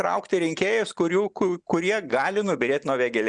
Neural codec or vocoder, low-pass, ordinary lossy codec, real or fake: none; 9.9 kHz; Opus, 64 kbps; real